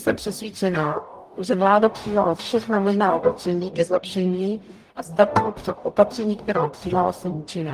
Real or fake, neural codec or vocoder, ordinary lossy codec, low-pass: fake; codec, 44.1 kHz, 0.9 kbps, DAC; Opus, 32 kbps; 14.4 kHz